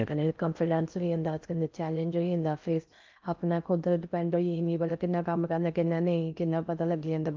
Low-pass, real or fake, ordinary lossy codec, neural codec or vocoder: 7.2 kHz; fake; Opus, 24 kbps; codec, 16 kHz in and 24 kHz out, 0.6 kbps, FocalCodec, streaming, 4096 codes